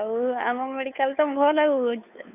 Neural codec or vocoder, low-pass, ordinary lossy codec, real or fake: codec, 16 kHz, 2 kbps, FunCodec, trained on Chinese and English, 25 frames a second; 3.6 kHz; none; fake